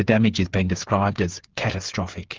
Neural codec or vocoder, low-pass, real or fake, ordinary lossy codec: codec, 16 kHz, 8 kbps, FreqCodec, smaller model; 7.2 kHz; fake; Opus, 16 kbps